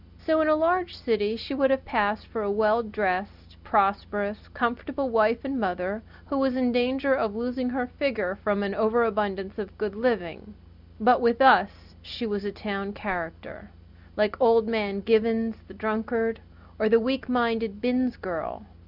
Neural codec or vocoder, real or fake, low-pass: none; real; 5.4 kHz